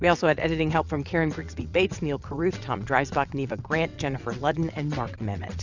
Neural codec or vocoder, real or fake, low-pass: vocoder, 22.05 kHz, 80 mel bands, WaveNeXt; fake; 7.2 kHz